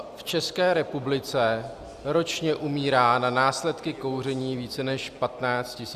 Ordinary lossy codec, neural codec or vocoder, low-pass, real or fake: Opus, 64 kbps; none; 14.4 kHz; real